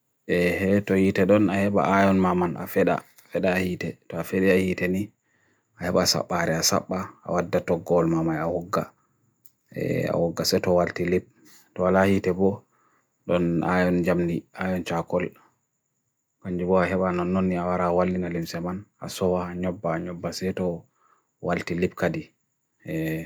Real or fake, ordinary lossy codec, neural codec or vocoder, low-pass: real; none; none; none